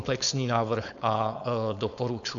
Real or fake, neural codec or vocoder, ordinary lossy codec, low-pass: fake; codec, 16 kHz, 4.8 kbps, FACodec; AAC, 64 kbps; 7.2 kHz